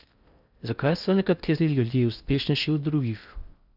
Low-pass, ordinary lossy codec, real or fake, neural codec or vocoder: 5.4 kHz; Opus, 64 kbps; fake; codec, 16 kHz in and 24 kHz out, 0.6 kbps, FocalCodec, streaming, 4096 codes